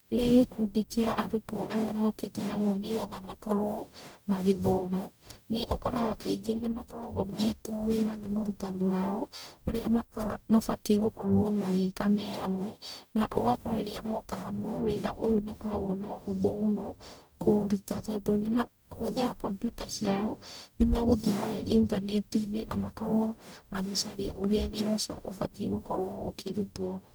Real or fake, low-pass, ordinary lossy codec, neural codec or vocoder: fake; none; none; codec, 44.1 kHz, 0.9 kbps, DAC